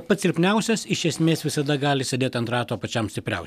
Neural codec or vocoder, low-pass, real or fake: none; 14.4 kHz; real